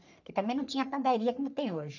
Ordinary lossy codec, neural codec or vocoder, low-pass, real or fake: none; codec, 44.1 kHz, 3.4 kbps, Pupu-Codec; 7.2 kHz; fake